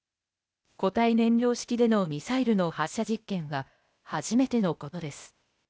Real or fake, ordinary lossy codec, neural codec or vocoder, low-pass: fake; none; codec, 16 kHz, 0.8 kbps, ZipCodec; none